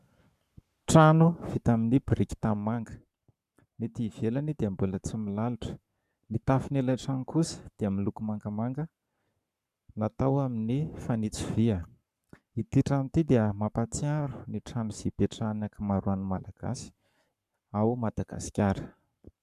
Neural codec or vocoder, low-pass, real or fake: codec, 44.1 kHz, 7.8 kbps, Pupu-Codec; 14.4 kHz; fake